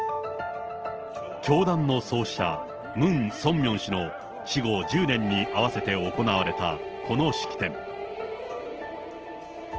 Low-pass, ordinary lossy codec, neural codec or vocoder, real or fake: 7.2 kHz; Opus, 16 kbps; none; real